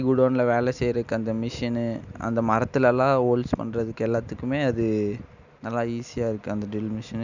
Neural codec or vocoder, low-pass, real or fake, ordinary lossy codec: none; 7.2 kHz; real; none